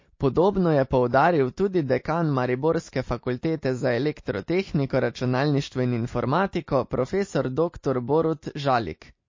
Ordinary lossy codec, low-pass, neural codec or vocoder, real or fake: MP3, 32 kbps; 7.2 kHz; none; real